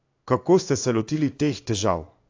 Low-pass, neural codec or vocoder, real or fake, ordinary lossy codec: 7.2 kHz; codec, 16 kHz in and 24 kHz out, 1 kbps, XY-Tokenizer; fake; AAC, 48 kbps